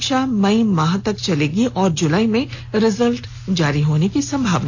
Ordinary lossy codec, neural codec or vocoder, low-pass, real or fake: AAC, 48 kbps; none; 7.2 kHz; real